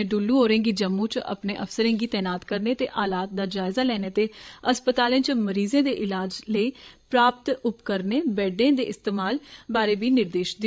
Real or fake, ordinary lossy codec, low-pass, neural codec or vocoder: fake; none; none; codec, 16 kHz, 16 kbps, FreqCodec, larger model